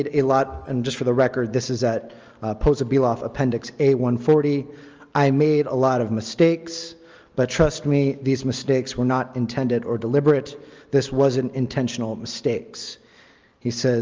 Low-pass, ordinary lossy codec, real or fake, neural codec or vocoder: 7.2 kHz; Opus, 24 kbps; real; none